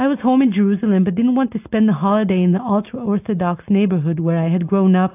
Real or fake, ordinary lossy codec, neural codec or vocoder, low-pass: real; AAC, 32 kbps; none; 3.6 kHz